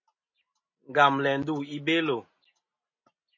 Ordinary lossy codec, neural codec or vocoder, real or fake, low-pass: MP3, 32 kbps; none; real; 7.2 kHz